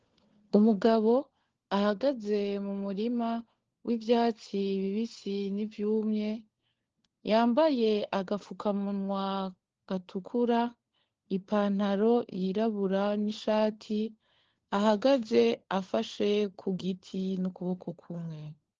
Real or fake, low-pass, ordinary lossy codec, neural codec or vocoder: fake; 7.2 kHz; Opus, 16 kbps; codec, 16 kHz, 4 kbps, FunCodec, trained on LibriTTS, 50 frames a second